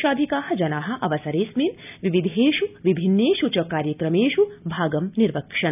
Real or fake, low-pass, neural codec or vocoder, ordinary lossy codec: real; 3.6 kHz; none; none